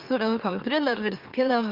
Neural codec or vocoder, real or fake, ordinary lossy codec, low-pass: autoencoder, 44.1 kHz, a latent of 192 numbers a frame, MeloTTS; fake; Opus, 24 kbps; 5.4 kHz